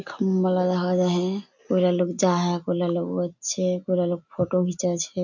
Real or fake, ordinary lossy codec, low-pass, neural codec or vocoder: real; none; 7.2 kHz; none